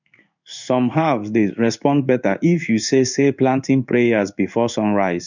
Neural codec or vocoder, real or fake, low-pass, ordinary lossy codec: codec, 16 kHz in and 24 kHz out, 1 kbps, XY-Tokenizer; fake; 7.2 kHz; none